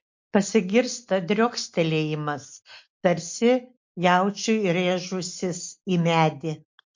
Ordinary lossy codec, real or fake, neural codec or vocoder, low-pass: MP3, 48 kbps; real; none; 7.2 kHz